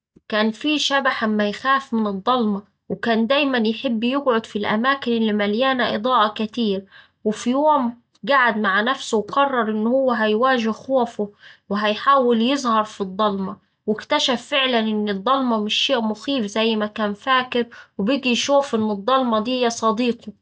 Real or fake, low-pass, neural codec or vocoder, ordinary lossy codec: real; none; none; none